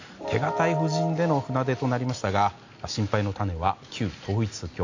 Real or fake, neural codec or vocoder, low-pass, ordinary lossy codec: real; none; 7.2 kHz; AAC, 48 kbps